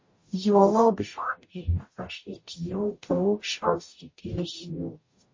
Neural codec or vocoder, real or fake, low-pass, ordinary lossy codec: codec, 44.1 kHz, 0.9 kbps, DAC; fake; 7.2 kHz; MP3, 32 kbps